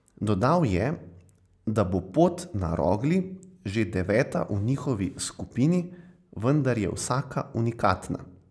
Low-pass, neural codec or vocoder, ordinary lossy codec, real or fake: none; none; none; real